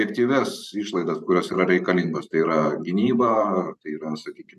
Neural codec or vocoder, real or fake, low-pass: vocoder, 44.1 kHz, 128 mel bands every 512 samples, BigVGAN v2; fake; 14.4 kHz